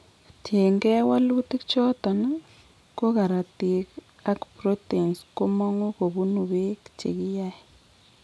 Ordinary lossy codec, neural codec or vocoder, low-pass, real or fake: none; none; none; real